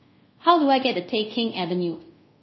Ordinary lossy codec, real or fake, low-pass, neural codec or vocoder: MP3, 24 kbps; fake; 7.2 kHz; codec, 24 kHz, 0.5 kbps, DualCodec